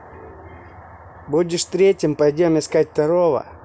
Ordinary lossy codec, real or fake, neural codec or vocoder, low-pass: none; real; none; none